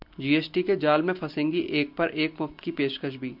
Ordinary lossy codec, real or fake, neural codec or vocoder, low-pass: MP3, 48 kbps; real; none; 5.4 kHz